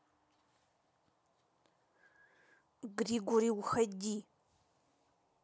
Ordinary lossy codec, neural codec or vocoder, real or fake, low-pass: none; none; real; none